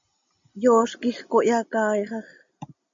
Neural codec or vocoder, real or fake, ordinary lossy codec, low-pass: none; real; MP3, 48 kbps; 7.2 kHz